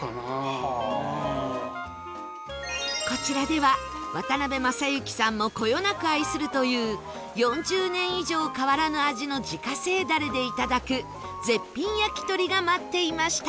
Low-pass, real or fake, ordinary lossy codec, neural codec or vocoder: none; real; none; none